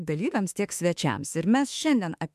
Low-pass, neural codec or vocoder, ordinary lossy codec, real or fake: 14.4 kHz; autoencoder, 48 kHz, 32 numbers a frame, DAC-VAE, trained on Japanese speech; MP3, 96 kbps; fake